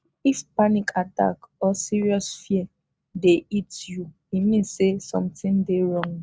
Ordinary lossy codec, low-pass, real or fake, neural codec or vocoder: none; none; real; none